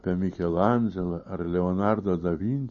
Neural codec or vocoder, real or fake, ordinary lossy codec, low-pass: none; real; MP3, 32 kbps; 7.2 kHz